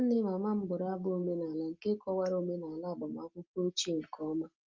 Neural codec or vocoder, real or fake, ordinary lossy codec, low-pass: none; real; Opus, 24 kbps; 7.2 kHz